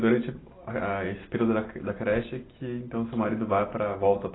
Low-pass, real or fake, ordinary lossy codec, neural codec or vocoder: 7.2 kHz; real; AAC, 16 kbps; none